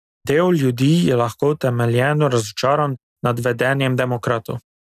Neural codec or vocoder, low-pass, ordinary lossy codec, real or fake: none; 14.4 kHz; none; real